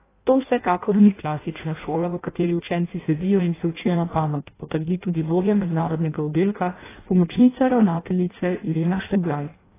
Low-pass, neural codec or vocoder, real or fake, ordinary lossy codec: 3.6 kHz; codec, 16 kHz in and 24 kHz out, 0.6 kbps, FireRedTTS-2 codec; fake; AAC, 16 kbps